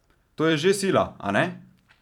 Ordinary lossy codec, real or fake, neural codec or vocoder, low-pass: none; fake; vocoder, 44.1 kHz, 128 mel bands every 512 samples, BigVGAN v2; 19.8 kHz